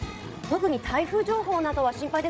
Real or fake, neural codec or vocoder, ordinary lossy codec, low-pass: fake; codec, 16 kHz, 16 kbps, FreqCodec, larger model; none; none